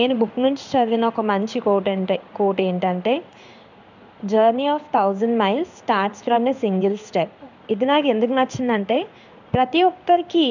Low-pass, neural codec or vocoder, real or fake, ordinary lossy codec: 7.2 kHz; codec, 16 kHz in and 24 kHz out, 1 kbps, XY-Tokenizer; fake; none